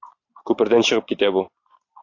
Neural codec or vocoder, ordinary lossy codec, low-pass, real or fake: vocoder, 44.1 kHz, 128 mel bands every 512 samples, BigVGAN v2; AAC, 48 kbps; 7.2 kHz; fake